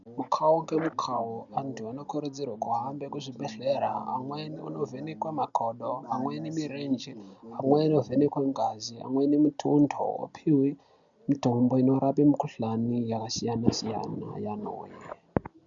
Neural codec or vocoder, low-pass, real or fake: none; 7.2 kHz; real